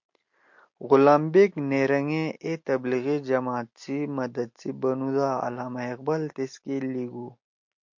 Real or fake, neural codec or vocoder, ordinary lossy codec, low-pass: real; none; MP3, 48 kbps; 7.2 kHz